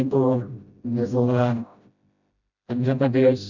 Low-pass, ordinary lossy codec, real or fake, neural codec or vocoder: 7.2 kHz; none; fake; codec, 16 kHz, 0.5 kbps, FreqCodec, smaller model